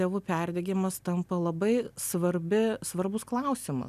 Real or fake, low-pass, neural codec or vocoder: real; 14.4 kHz; none